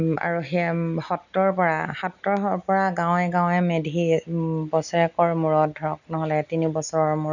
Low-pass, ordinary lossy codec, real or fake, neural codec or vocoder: 7.2 kHz; none; real; none